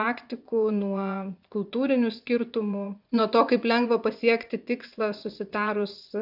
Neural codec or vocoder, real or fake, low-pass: vocoder, 24 kHz, 100 mel bands, Vocos; fake; 5.4 kHz